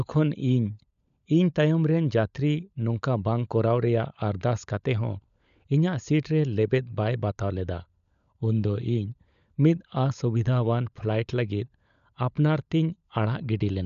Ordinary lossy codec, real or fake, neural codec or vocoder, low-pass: none; fake; codec, 16 kHz, 16 kbps, FunCodec, trained on LibriTTS, 50 frames a second; 7.2 kHz